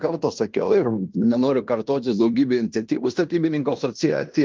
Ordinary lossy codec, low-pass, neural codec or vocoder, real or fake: Opus, 32 kbps; 7.2 kHz; codec, 16 kHz in and 24 kHz out, 0.9 kbps, LongCat-Audio-Codec, fine tuned four codebook decoder; fake